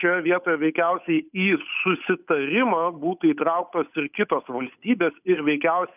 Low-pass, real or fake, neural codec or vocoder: 3.6 kHz; fake; codec, 16 kHz, 8 kbps, FunCodec, trained on Chinese and English, 25 frames a second